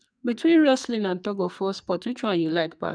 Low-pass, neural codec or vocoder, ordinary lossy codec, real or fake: 9.9 kHz; codec, 44.1 kHz, 2.6 kbps, SNAC; none; fake